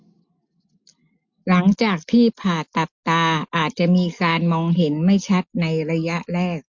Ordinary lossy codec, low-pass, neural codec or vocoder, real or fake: MP3, 48 kbps; 7.2 kHz; none; real